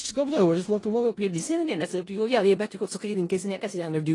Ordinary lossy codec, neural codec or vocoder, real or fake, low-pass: AAC, 32 kbps; codec, 16 kHz in and 24 kHz out, 0.4 kbps, LongCat-Audio-Codec, four codebook decoder; fake; 10.8 kHz